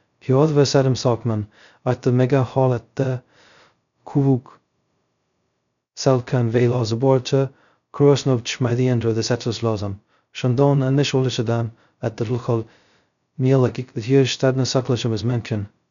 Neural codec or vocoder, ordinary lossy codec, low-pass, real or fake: codec, 16 kHz, 0.2 kbps, FocalCodec; none; 7.2 kHz; fake